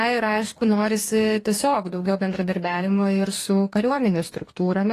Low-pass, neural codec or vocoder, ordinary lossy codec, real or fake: 14.4 kHz; codec, 44.1 kHz, 2.6 kbps, DAC; AAC, 48 kbps; fake